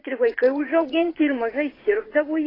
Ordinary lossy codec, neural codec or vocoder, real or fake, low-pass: AAC, 24 kbps; vocoder, 24 kHz, 100 mel bands, Vocos; fake; 5.4 kHz